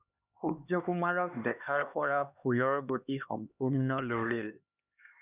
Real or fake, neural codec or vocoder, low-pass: fake; codec, 16 kHz, 2 kbps, X-Codec, HuBERT features, trained on LibriSpeech; 3.6 kHz